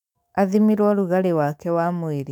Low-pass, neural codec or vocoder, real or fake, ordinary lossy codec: 19.8 kHz; autoencoder, 48 kHz, 128 numbers a frame, DAC-VAE, trained on Japanese speech; fake; none